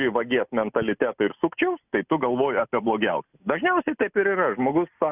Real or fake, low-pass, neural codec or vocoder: real; 3.6 kHz; none